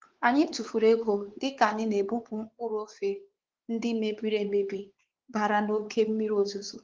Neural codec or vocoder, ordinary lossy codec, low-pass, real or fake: codec, 16 kHz, 4 kbps, X-Codec, WavLM features, trained on Multilingual LibriSpeech; Opus, 16 kbps; 7.2 kHz; fake